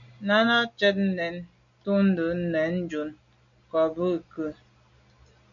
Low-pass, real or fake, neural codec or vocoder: 7.2 kHz; real; none